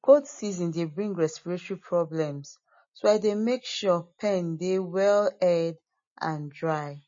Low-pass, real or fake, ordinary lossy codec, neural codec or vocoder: 7.2 kHz; real; MP3, 32 kbps; none